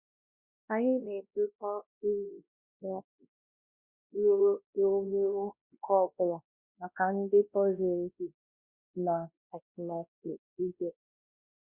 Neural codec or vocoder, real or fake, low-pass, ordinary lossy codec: codec, 16 kHz, 1 kbps, X-Codec, WavLM features, trained on Multilingual LibriSpeech; fake; 3.6 kHz; Opus, 64 kbps